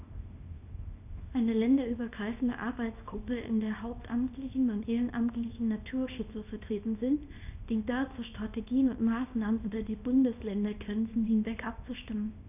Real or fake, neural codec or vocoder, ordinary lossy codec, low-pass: fake; codec, 24 kHz, 0.9 kbps, WavTokenizer, small release; none; 3.6 kHz